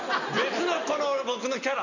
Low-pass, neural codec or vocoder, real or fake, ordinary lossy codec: 7.2 kHz; none; real; none